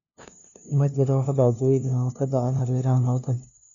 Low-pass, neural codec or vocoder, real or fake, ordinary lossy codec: 7.2 kHz; codec, 16 kHz, 0.5 kbps, FunCodec, trained on LibriTTS, 25 frames a second; fake; none